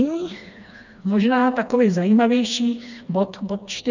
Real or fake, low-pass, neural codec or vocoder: fake; 7.2 kHz; codec, 16 kHz, 2 kbps, FreqCodec, smaller model